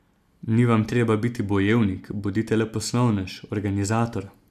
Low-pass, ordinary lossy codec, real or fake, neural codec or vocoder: 14.4 kHz; none; real; none